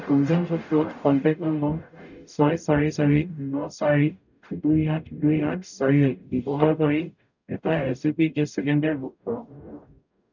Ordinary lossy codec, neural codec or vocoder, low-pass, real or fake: none; codec, 44.1 kHz, 0.9 kbps, DAC; 7.2 kHz; fake